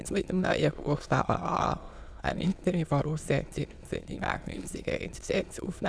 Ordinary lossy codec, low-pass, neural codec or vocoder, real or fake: none; none; autoencoder, 22.05 kHz, a latent of 192 numbers a frame, VITS, trained on many speakers; fake